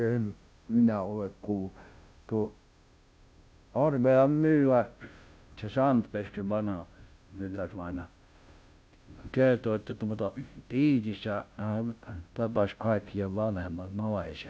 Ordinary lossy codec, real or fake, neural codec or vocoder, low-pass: none; fake; codec, 16 kHz, 0.5 kbps, FunCodec, trained on Chinese and English, 25 frames a second; none